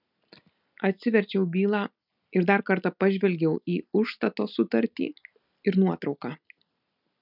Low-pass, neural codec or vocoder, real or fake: 5.4 kHz; none; real